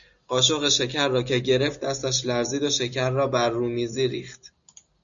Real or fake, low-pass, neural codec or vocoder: real; 7.2 kHz; none